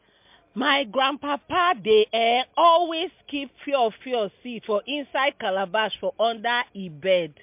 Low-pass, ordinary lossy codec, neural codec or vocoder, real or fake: 3.6 kHz; MP3, 32 kbps; none; real